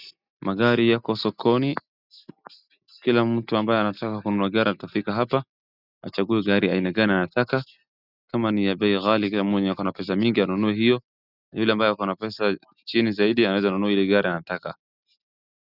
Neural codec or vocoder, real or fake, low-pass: none; real; 5.4 kHz